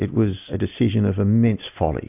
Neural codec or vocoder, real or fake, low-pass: none; real; 3.6 kHz